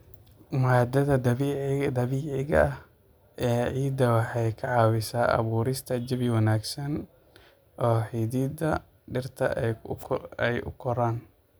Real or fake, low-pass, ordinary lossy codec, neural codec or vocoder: real; none; none; none